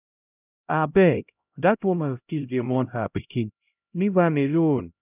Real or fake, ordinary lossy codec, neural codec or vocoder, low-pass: fake; none; codec, 16 kHz, 0.5 kbps, X-Codec, HuBERT features, trained on LibriSpeech; 3.6 kHz